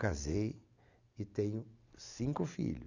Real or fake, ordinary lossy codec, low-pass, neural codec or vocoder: real; none; 7.2 kHz; none